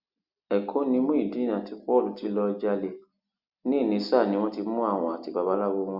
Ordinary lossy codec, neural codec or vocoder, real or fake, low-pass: Opus, 64 kbps; none; real; 5.4 kHz